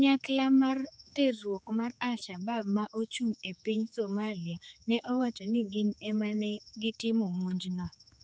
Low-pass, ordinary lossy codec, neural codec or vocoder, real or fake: none; none; codec, 16 kHz, 4 kbps, X-Codec, HuBERT features, trained on general audio; fake